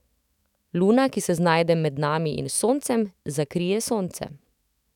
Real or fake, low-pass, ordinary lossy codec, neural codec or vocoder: fake; 19.8 kHz; none; autoencoder, 48 kHz, 128 numbers a frame, DAC-VAE, trained on Japanese speech